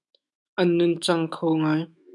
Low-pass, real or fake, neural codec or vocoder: 10.8 kHz; fake; autoencoder, 48 kHz, 128 numbers a frame, DAC-VAE, trained on Japanese speech